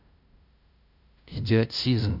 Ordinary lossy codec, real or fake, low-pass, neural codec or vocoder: AAC, 48 kbps; fake; 5.4 kHz; codec, 16 kHz, 0.5 kbps, FunCodec, trained on LibriTTS, 25 frames a second